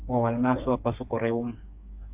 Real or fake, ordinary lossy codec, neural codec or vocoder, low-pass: fake; AAC, 32 kbps; codec, 16 kHz, 4 kbps, FreqCodec, smaller model; 3.6 kHz